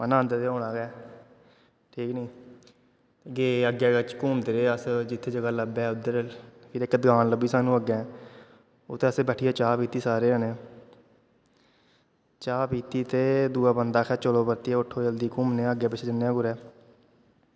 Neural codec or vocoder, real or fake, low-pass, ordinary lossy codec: none; real; none; none